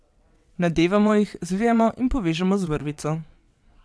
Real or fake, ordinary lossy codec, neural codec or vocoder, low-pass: fake; none; vocoder, 22.05 kHz, 80 mel bands, WaveNeXt; none